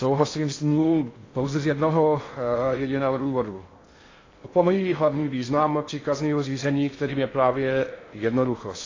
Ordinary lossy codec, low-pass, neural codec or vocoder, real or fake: AAC, 32 kbps; 7.2 kHz; codec, 16 kHz in and 24 kHz out, 0.6 kbps, FocalCodec, streaming, 2048 codes; fake